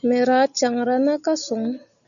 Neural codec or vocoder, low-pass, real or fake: none; 7.2 kHz; real